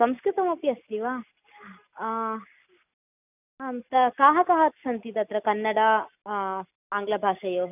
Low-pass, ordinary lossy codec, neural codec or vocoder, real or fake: 3.6 kHz; none; none; real